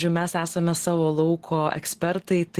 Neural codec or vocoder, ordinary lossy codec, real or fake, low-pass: none; Opus, 16 kbps; real; 14.4 kHz